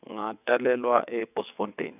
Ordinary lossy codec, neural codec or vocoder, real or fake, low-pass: none; vocoder, 22.05 kHz, 80 mel bands, WaveNeXt; fake; 3.6 kHz